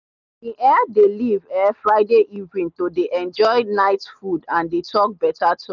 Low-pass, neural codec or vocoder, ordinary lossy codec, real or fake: 7.2 kHz; none; none; real